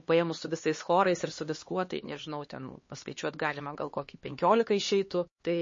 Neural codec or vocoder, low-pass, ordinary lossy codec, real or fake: codec, 16 kHz, 2 kbps, X-Codec, HuBERT features, trained on LibriSpeech; 7.2 kHz; MP3, 32 kbps; fake